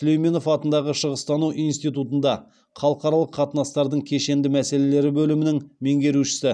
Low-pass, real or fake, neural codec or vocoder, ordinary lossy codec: 9.9 kHz; real; none; none